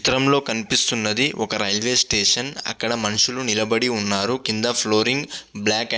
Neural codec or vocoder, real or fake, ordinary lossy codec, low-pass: none; real; none; none